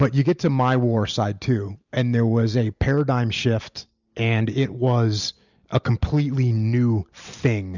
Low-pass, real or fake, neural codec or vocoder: 7.2 kHz; real; none